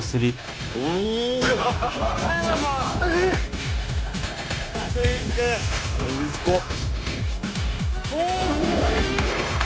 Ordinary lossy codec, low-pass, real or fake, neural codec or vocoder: none; none; fake; codec, 16 kHz, 0.9 kbps, LongCat-Audio-Codec